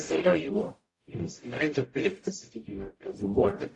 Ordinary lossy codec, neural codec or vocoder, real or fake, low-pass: AAC, 48 kbps; codec, 44.1 kHz, 0.9 kbps, DAC; fake; 10.8 kHz